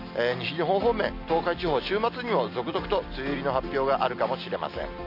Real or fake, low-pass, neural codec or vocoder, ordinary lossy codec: real; 5.4 kHz; none; AAC, 48 kbps